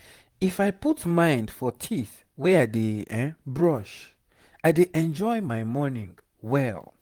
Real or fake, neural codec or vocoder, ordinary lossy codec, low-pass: fake; vocoder, 44.1 kHz, 128 mel bands, Pupu-Vocoder; Opus, 24 kbps; 19.8 kHz